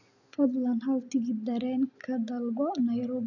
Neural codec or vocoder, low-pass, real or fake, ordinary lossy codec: none; 7.2 kHz; real; none